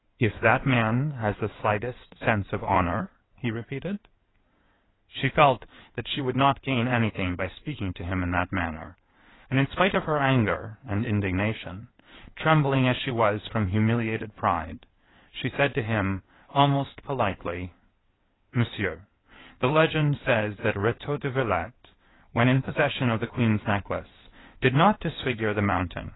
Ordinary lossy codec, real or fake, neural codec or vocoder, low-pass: AAC, 16 kbps; fake; codec, 16 kHz in and 24 kHz out, 2.2 kbps, FireRedTTS-2 codec; 7.2 kHz